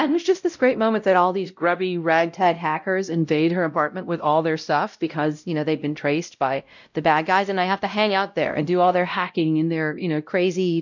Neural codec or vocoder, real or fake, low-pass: codec, 16 kHz, 0.5 kbps, X-Codec, WavLM features, trained on Multilingual LibriSpeech; fake; 7.2 kHz